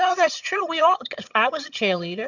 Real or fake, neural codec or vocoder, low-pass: fake; vocoder, 22.05 kHz, 80 mel bands, HiFi-GAN; 7.2 kHz